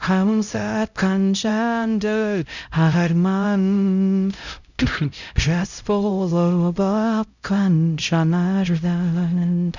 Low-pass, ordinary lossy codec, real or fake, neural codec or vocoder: 7.2 kHz; none; fake; codec, 16 kHz, 0.5 kbps, X-Codec, HuBERT features, trained on LibriSpeech